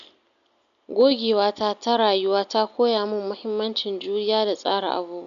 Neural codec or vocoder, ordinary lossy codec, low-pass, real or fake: none; none; 7.2 kHz; real